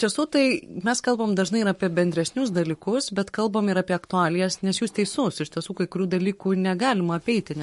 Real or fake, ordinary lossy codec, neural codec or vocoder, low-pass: fake; MP3, 48 kbps; codec, 44.1 kHz, 7.8 kbps, Pupu-Codec; 14.4 kHz